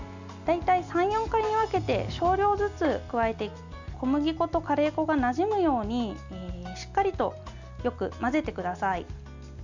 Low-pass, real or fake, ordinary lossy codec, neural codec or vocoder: 7.2 kHz; real; none; none